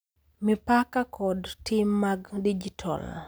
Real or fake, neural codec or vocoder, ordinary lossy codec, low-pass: real; none; none; none